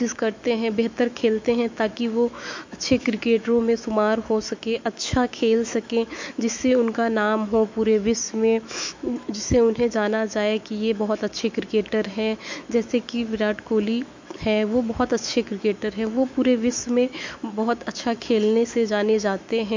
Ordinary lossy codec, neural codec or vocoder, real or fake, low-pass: MP3, 48 kbps; autoencoder, 48 kHz, 128 numbers a frame, DAC-VAE, trained on Japanese speech; fake; 7.2 kHz